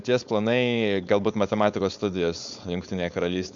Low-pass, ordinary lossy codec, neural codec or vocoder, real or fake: 7.2 kHz; MP3, 64 kbps; codec, 16 kHz, 4.8 kbps, FACodec; fake